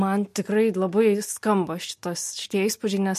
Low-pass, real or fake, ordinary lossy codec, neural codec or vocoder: 14.4 kHz; real; MP3, 64 kbps; none